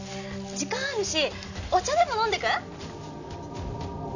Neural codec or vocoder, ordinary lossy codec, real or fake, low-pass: none; none; real; 7.2 kHz